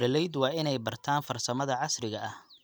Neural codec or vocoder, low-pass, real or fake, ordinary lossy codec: none; none; real; none